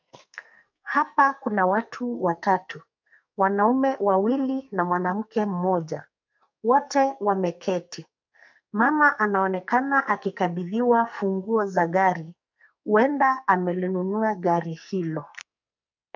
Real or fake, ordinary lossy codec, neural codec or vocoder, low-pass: fake; MP3, 64 kbps; codec, 44.1 kHz, 2.6 kbps, SNAC; 7.2 kHz